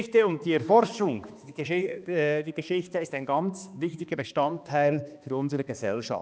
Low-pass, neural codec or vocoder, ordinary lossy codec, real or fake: none; codec, 16 kHz, 2 kbps, X-Codec, HuBERT features, trained on balanced general audio; none; fake